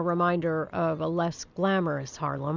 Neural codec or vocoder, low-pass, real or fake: none; 7.2 kHz; real